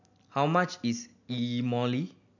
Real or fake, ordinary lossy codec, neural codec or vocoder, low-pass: real; none; none; 7.2 kHz